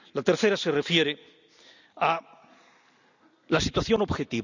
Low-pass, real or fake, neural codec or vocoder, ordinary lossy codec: 7.2 kHz; real; none; none